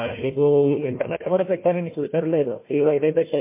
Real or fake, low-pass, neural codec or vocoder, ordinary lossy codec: fake; 3.6 kHz; codec, 16 kHz, 1 kbps, FunCodec, trained on Chinese and English, 50 frames a second; MP3, 24 kbps